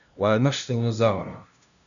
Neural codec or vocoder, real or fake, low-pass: codec, 16 kHz, 0.5 kbps, FunCodec, trained on LibriTTS, 25 frames a second; fake; 7.2 kHz